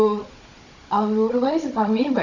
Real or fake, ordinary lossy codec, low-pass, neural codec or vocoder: fake; none; 7.2 kHz; codec, 16 kHz, 16 kbps, FunCodec, trained on Chinese and English, 50 frames a second